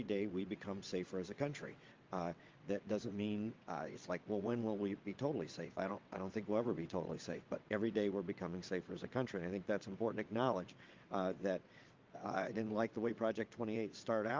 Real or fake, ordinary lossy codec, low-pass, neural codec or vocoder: fake; Opus, 32 kbps; 7.2 kHz; vocoder, 22.05 kHz, 80 mel bands, WaveNeXt